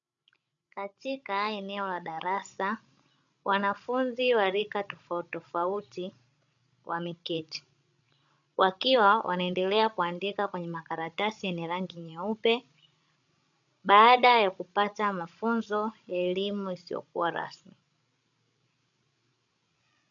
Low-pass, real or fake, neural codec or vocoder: 7.2 kHz; fake; codec, 16 kHz, 16 kbps, FreqCodec, larger model